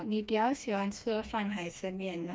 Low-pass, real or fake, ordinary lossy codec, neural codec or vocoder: none; fake; none; codec, 16 kHz, 2 kbps, FreqCodec, smaller model